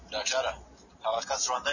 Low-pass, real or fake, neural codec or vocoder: 7.2 kHz; real; none